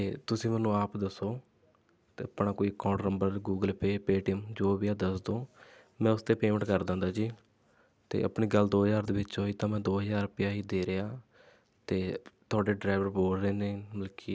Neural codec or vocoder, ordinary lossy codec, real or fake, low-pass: none; none; real; none